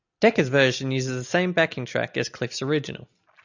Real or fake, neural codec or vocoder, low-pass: real; none; 7.2 kHz